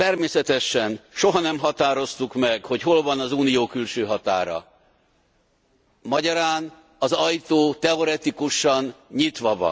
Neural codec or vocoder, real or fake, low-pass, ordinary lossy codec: none; real; none; none